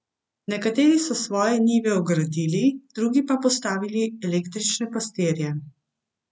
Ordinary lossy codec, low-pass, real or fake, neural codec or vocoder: none; none; real; none